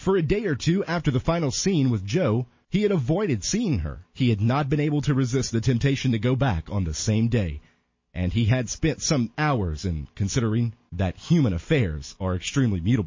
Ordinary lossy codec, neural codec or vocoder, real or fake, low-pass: MP3, 32 kbps; none; real; 7.2 kHz